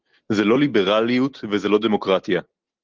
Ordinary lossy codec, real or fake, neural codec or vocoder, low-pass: Opus, 16 kbps; real; none; 7.2 kHz